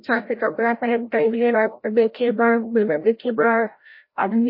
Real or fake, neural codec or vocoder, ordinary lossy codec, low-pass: fake; codec, 16 kHz, 0.5 kbps, FreqCodec, larger model; MP3, 32 kbps; 5.4 kHz